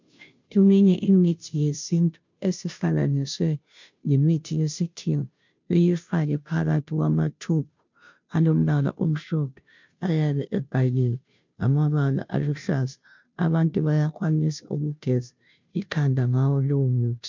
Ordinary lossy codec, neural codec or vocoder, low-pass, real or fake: MP3, 64 kbps; codec, 16 kHz, 0.5 kbps, FunCodec, trained on Chinese and English, 25 frames a second; 7.2 kHz; fake